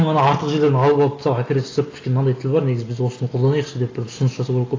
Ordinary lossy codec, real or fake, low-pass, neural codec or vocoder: AAC, 32 kbps; real; 7.2 kHz; none